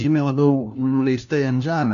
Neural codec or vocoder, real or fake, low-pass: codec, 16 kHz, 1 kbps, FunCodec, trained on LibriTTS, 50 frames a second; fake; 7.2 kHz